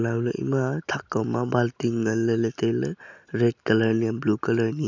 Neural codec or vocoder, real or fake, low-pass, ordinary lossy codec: none; real; 7.2 kHz; none